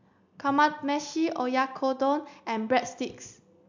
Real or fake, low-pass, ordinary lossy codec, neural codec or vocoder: real; 7.2 kHz; MP3, 64 kbps; none